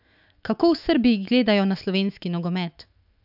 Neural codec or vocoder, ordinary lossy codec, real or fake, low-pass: autoencoder, 48 kHz, 128 numbers a frame, DAC-VAE, trained on Japanese speech; none; fake; 5.4 kHz